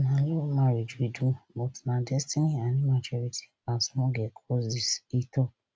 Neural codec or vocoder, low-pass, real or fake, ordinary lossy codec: none; none; real; none